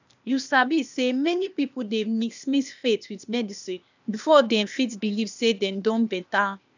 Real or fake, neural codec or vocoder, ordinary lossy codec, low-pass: fake; codec, 16 kHz, 0.8 kbps, ZipCodec; none; 7.2 kHz